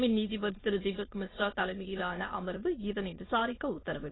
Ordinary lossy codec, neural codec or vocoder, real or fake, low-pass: AAC, 16 kbps; autoencoder, 22.05 kHz, a latent of 192 numbers a frame, VITS, trained on many speakers; fake; 7.2 kHz